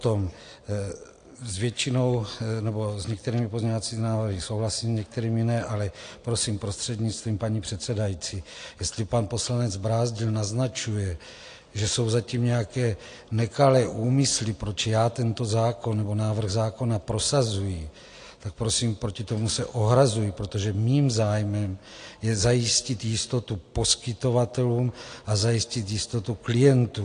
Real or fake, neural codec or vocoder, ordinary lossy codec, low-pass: real; none; AAC, 48 kbps; 9.9 kHz